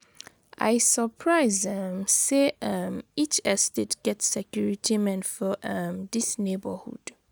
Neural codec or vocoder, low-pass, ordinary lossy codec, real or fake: none; none; none; real